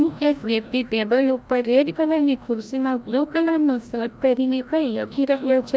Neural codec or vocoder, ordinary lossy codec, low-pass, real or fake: codec, 16 kHz, 0.5 kbps, FreqCodec, larger model; none; none; fake